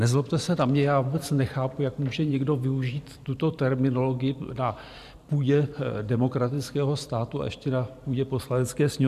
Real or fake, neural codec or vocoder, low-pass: fake; vocoder, 44.1 kHz, 128 mel bands every 512 samples, BigVGAN v2; 14.4 kHz